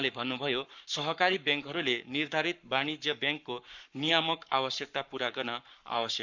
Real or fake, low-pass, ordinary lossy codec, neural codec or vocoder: fake; 7.2 kHz; none; codec, 44.1 kHz, 7.8 kbps, Pupu-Codec